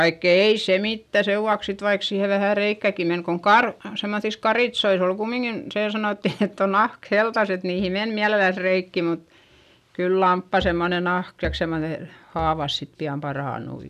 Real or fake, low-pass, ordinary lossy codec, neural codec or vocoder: real; 14.4 kHz; none; none